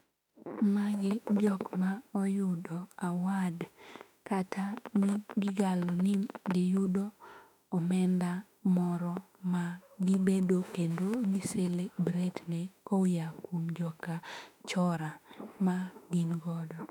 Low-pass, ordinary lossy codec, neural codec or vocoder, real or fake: 19.8 kHz; none; autoencoder, 48 kHz, 32 numbers a frame, DAC-VAE, trained on Japanese speech; fake